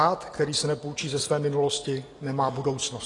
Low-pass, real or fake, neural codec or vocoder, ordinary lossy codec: 10.8 kHz; real; none; AAC, 32 kbps